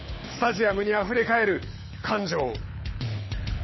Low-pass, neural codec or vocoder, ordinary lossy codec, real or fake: 7.2 kHz; codec, 24 kHz, 6 kbps, HILCodec; MP3, 24 kbps; fake